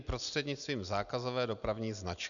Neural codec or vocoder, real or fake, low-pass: none; real; 7.2 kHz